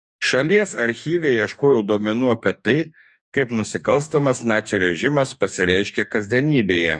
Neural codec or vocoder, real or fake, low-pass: codec, 44.1 kHz, 2.6 kbps, DAC; fake; 10.8 kHz